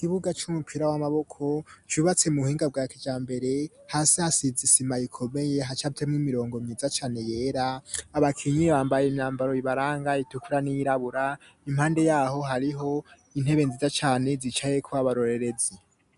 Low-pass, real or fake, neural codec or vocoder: 10.8 kHz; real; none